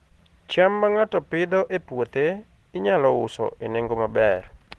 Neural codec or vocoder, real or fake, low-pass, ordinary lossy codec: none; real; 10.8 kHz; Opus, 16 kbps